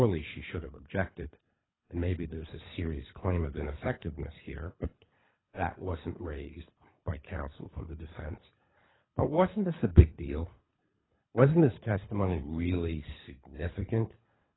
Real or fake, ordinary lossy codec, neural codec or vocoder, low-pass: fake; AAC, 16 kbps; codec, 24 kHz, 3 kbps, HILCodec; 7.2 kHz